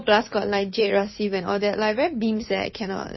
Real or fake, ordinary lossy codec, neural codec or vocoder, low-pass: fake; MP3, 24 kbps; codec, 16 kHz, 2 kbps, FunCodec, trained on Chinese and English, 25 frames a second; 7.2 kHz